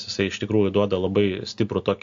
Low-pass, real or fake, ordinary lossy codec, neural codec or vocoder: 7.2 kHz; real; AAC, 64 kbps; none